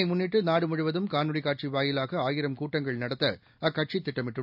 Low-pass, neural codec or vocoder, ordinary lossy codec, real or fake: 5.4 kHz; none; none; real